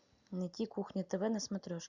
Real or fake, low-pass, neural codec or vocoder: real; 7.2 kHz; none